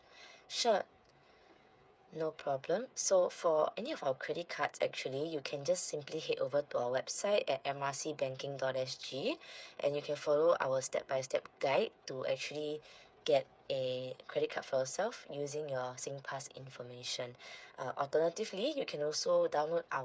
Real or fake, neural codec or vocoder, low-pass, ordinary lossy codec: fake; codec, 16 kHz, 8 kbps, FreqCodec, smaller model; none; none